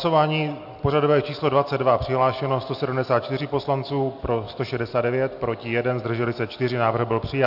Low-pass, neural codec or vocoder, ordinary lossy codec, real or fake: 5.4 kHz; none; AAC, 48 kbps; real